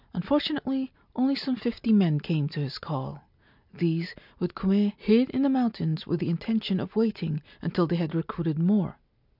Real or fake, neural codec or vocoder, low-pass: real; none; 5.4 kHz